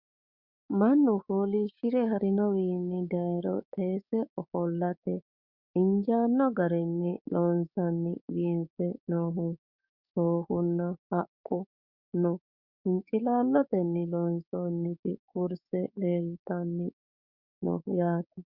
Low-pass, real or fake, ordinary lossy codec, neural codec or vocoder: 5.4 kHz; fake; Opus, 64 kbps; codec, 16 kHz, 6 kbps, DAC